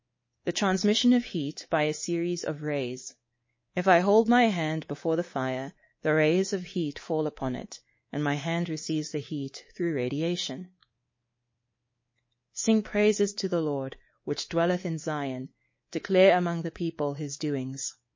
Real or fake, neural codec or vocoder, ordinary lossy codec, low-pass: fake; codec, 24 kHz, 3.1 kbps, DualCodec; MP3, 32 kbps; 7.2 kHz